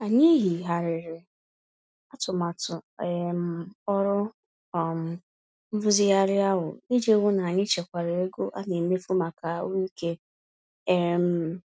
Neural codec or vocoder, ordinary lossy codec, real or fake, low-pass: none; none; real; none